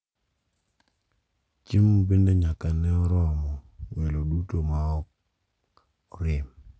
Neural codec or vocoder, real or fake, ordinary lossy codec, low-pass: none; real; none; none